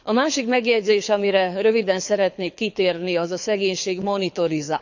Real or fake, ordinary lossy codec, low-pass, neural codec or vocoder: fake; none; 7.2 kHz; codec, 24 kHz, 6 kbps, HILCodec